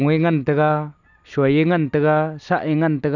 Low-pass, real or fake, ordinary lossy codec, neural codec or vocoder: 7.2 kHz; real; none; none